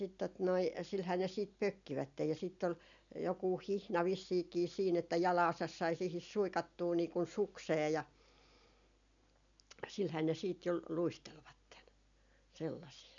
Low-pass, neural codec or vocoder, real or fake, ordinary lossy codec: 7.2 kHz; none; real; none